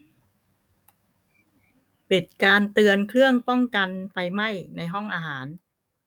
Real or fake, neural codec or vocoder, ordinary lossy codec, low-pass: fake; codec, 44.1 kHz, 7.8 kbps, DAC; none; 19.8 kHz